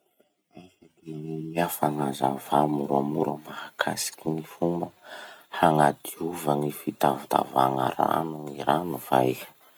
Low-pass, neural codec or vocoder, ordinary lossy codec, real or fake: none; none; none; real